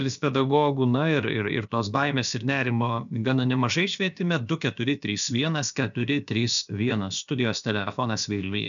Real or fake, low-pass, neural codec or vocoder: fake; 7.2 kHz; codec, 16 kHz, about 1 kbps, DyCAST, with the encoder's durations